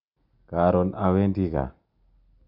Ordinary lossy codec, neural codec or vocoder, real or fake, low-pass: MP3, 48 kbps; none; real; 5.4 kHz